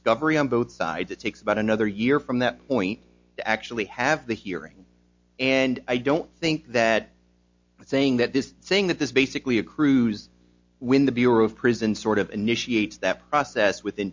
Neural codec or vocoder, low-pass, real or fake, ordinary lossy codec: none; 7.2 kHz; real; MP3, 48 kbps